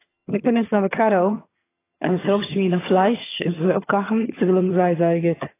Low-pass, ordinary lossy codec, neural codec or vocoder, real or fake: 3.6 kHz; AAC, 16 kbps; vocoder, 22.05 kHz, 80 mel bands, HiFi-GAN; fake